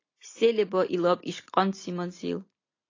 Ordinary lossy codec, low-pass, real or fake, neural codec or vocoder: AAC, 32 kbps; 7.2 kHz; real; none